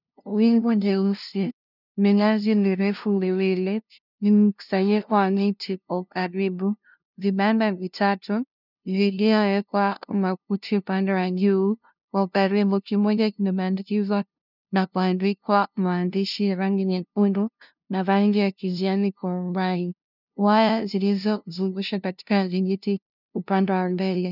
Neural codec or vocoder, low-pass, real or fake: codec, 16 kHz, 0.5 kbps, FunCodec, trained on LibriTTS, 25 frames a second; 5.4 kHz; fake